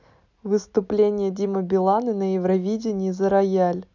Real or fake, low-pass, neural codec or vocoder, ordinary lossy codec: real; 7.2 kHz; none; none